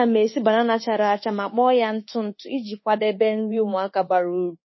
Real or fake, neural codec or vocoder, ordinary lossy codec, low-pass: fake; codec, 24 kHz, 1.2 kbps, DualCodec; MP3, 24 kbps; 7.2 kHz